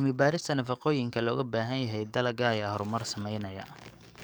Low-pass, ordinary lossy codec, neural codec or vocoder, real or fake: none; none; codec, 44.1 kHz, 7.8 kbps, DAC; fake